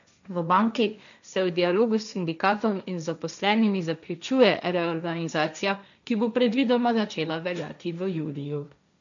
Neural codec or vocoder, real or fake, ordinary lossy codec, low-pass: codec, 16 kHz, 1.1 kbps, Voila-Tokenizer; fake; none; 7.2 kHz